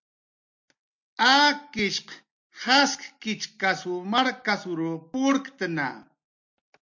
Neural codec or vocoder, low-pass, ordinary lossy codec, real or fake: none; 7.2 kHz; MP3, 48 kbps; real